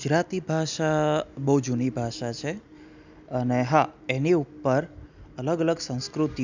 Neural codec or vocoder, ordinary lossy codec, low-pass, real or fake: none; none; 7.2 kHz; real